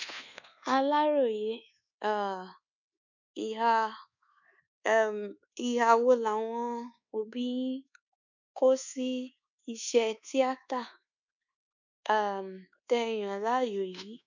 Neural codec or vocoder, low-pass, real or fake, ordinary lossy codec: codec, 24 kHz, 1.2 kbps, DualCodec; 7.2 kHz; fake; none